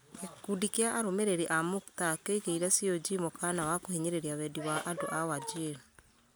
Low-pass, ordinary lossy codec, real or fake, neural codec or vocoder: none; none; real; none